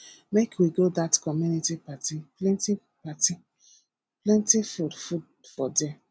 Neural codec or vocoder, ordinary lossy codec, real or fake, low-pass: none; none; real; none